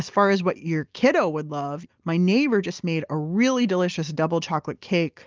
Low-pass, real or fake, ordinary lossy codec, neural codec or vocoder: 7.2 kHz; real; Opus, 24 kbps; none